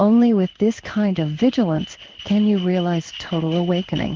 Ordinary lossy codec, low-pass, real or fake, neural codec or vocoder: Opus, 16 kbps; 7.2 kHz; fake; codec, 16 kHz in and 24 kHz out, 1 kbps, XY-Tokenizer